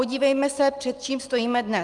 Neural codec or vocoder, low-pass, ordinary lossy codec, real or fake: none; 10.8 kHz; Opus, 32 kbps; real